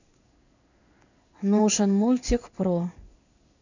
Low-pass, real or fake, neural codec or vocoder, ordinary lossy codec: 7.2 kHz; fake; codec, 16 kHz in and 24 kHz out, 1 kbps, XY-Tokenizer; none